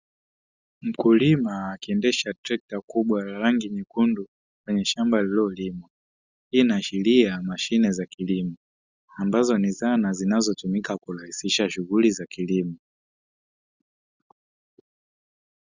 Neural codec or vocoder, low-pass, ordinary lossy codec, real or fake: none; 7.2 kHz; Opus, 64 kbps; real